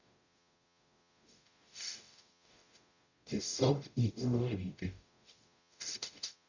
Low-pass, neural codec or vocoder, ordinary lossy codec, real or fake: 7.2 kHz; codec, 44.1 kHz, 0.9 kbps, DAC; none; fake